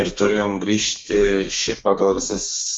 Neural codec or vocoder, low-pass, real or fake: codec, 32 kHz, 1.9 kbps, SNAC; 9.9 kHz; fake